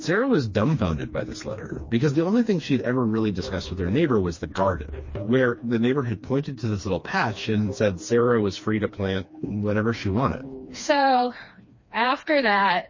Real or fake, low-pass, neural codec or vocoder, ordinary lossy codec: fake; 7.2 kHz; codec, 16 kHz, 2 kbps, FreqCodec, smaller model; MP3, 32 kbps